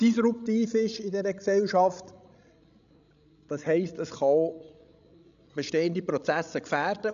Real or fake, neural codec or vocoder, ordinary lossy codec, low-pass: fake; codec, 16 kHz, 16 kbps, FreqCodec, larger model; none; 7.2 kHz